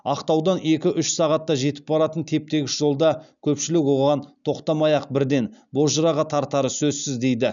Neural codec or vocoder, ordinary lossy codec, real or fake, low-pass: none; none; real; 7.2 kHz